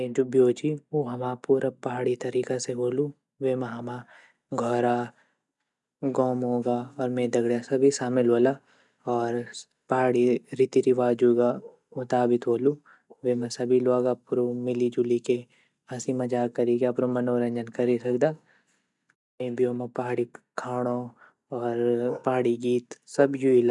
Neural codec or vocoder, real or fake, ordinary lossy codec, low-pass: none; real; none; 10.8 kHz